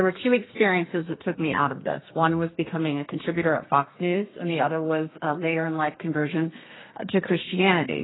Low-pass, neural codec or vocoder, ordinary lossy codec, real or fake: 7.2 kHz; codec, 32 kHz, 1.9 kbps, SNAC; AAC, 16 kbps; fake